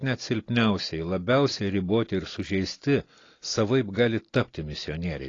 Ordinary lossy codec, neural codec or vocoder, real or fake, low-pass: AAC, 32 kbps; none; real; 7.2 kHz